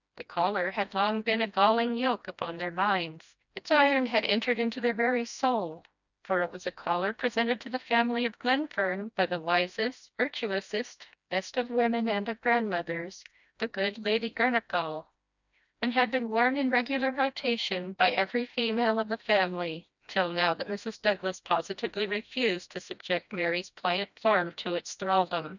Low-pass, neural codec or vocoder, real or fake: 7.2 kHz; codec, 16 kHz, 1 kbps, FreqCodec, smaller model; fake